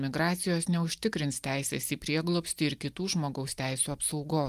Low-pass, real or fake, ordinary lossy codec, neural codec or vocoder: 14.4 kHz; real; Opus, 32 kbps; none